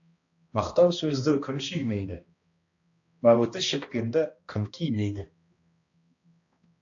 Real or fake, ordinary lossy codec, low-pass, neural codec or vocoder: fake; AAC, 48 kbps; 7.2 kHz; codec, 16 kHz, 1 kbps, X-Codec, HuBERT features, trained on balanced general audio